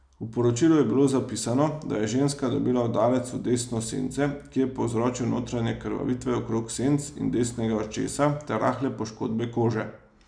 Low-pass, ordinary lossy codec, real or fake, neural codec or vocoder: 9.9 kHz; none; real; none